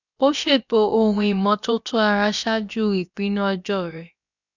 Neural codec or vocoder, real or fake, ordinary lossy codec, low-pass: codec, 16 kHz, about 1 kbps, DyCAST, with the encoder's durations; fake; none; 7.2 kHz